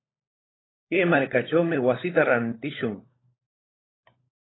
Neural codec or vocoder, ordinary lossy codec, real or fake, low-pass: codec, 16 kHz, 16 kbps, FunCodec, trained on LibriTTS, 50 frames a second; AAC, 16 kbps; fake; 7.2 kHz